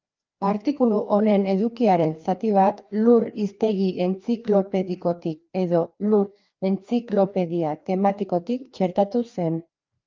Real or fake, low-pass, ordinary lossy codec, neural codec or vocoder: fake; 7.2 kHz; Opus, 24 kbps; codec, 16 kHz, 2 kbps, FreqCodec, larger model